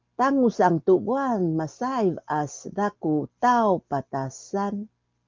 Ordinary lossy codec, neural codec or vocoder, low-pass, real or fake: Opus, 24 kbps; none; 7.2 kHz; real